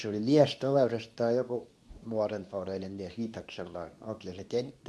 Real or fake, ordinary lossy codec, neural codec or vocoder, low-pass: fake; none; codec, 24 kHz, 0.9 kbps, WavTokenizer, medium speech release version 2; none